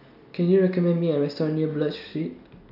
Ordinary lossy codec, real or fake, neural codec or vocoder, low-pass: none; real; none; 5.4 kHz